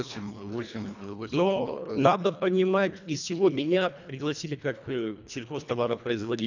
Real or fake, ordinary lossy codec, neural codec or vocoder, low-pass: fake; none; codec, 24 kHz, 1.5 kbps, HILCodec; 7.2 kHz